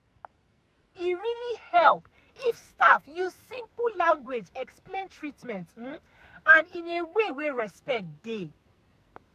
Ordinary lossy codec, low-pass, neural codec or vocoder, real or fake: none; 14.4 kHz; codec, 44.1 kHz, 2.6 kbps, SNAC; fake